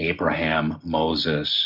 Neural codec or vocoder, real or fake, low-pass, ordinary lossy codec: none; real; 5.4 kHz; MP3, 48 kbps